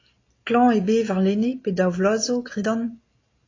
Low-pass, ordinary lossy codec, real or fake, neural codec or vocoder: 7.2 kHz; AAC, 32 kbps; real; none